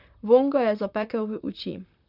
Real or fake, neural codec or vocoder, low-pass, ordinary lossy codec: fake; vocoder, 44.1 kHz, 128 mel bands, Pupu-Vocoder; 5.4 kHz; none